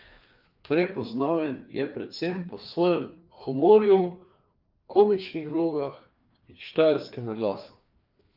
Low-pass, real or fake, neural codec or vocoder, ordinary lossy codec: 5.4 kHz; fake; codec, 16 kHz, 2 kbps, FreqCodec, larger model; Opus, 32 kbps